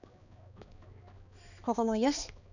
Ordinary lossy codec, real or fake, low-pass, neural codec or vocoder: none; fake; 7.2 kHz; codec, 16 kHz, 2 kbps, X-Codec, HuBERT features, trained on balanced general audio